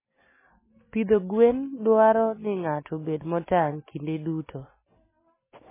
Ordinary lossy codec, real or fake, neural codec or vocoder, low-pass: MP3, 16 kbps; real; none; 3.6 kHz